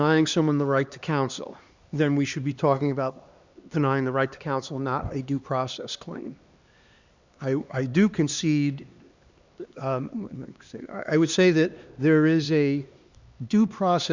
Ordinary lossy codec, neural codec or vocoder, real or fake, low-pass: Opus, 64 kbps; codec, 16 kHz, 2 kbps, X-Codec, HuBERT features, trained on LibriSpeech; fake; 7.2 kHz